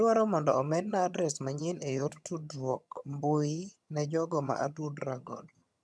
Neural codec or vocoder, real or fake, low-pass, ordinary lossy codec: vocoder, 22.05 kHz, 80 mel bands, HiFi-GAN; fake; none; none